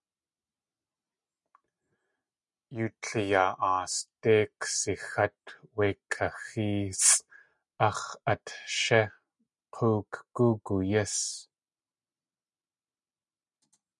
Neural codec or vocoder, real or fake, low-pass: none; real; 10.8 kHz